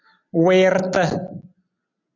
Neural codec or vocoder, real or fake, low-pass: none; real; 7.2 kHz